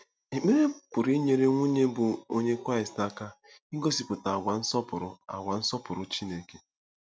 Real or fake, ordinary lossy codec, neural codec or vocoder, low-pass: real; none; none; none